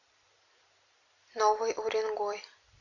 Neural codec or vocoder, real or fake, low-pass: none; real; 7.2 kHz